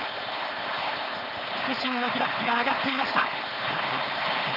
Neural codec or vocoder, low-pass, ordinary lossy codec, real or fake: codec, 16 kHz, 4.8 kbps, FACodec; 5.4 kHz; none; fake